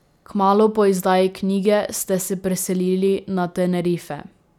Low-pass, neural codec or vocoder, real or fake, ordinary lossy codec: 19.8 kHz; none; real; none